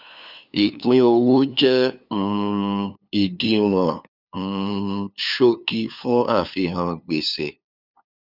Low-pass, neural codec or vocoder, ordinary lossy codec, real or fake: 5.4 kHz; codec, 16 kHz, 2 kbps, FunCodec, trained on LibriTTS, 25 frames a second; none; fake